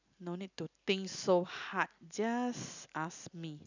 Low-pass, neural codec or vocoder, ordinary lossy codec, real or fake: 7.2 kHz; none; none; real